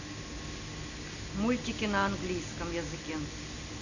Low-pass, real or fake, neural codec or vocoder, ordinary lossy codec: 7.2 kHz; real; none; none